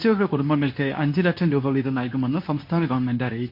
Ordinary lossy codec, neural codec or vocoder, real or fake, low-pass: MP3, 32 kbps; codec, 24 kHz, 0.9 kbps, WavTokenizer, medium speech release version 2; fake; 5.4 kHz